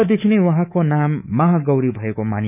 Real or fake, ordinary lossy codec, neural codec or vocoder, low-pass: fake; none; codec, 24 kHz, 3.1 kbps, DualCodec; 3.6 kHz